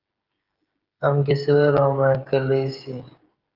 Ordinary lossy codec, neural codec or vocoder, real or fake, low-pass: Opus, 24 kbps; codec, 16 kHz, 16 kbps, FreqCodec, smaller model; fake; 5.4 kHz